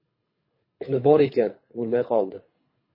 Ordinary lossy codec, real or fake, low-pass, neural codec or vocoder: MP3, 24 kbps; fake; 5.4 kHz; codec, 24 kHz, 3 kbps, HILCodec